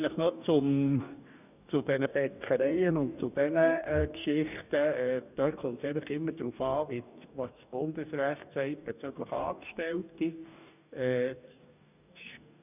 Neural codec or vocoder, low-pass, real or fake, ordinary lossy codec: codec, 44.1 kHz, 2.6 kbps, DAC; 3.6 kHz; fake; none